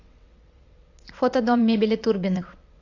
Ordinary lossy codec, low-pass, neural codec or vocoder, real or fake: AAC, 48 kbps; 7.2 kHz; vocoder, 22.05 kHz, 80 mel bands, WaveNeXt; fake